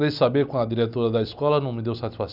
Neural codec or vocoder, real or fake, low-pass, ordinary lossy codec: none; real; 5.4 kHz; none